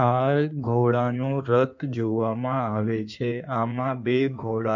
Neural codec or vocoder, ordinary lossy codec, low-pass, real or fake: codec, 16 kHz in and 24 kHz out, 1.1 kbps, FireRedTTS-2 codec; none; 7.2 kHz; fake